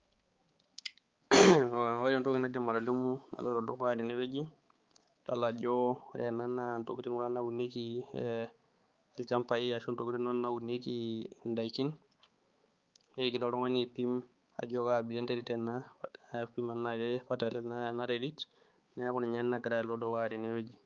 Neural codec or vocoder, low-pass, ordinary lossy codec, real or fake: codec, 16 kHz, 4 kbps, X-Codec, HuBERT features, trained on balanced general audio; 7.2 kHz; Opus, 32 kbps; fake